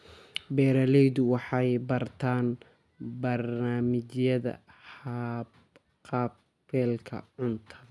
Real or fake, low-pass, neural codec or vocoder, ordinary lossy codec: real; none; none; none